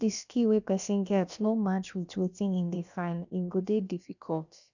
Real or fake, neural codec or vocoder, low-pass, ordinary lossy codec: fake; codec, 16 kHz, about 1 kbps, DyCAST, with the encoder's durations; 7.2 kHz; none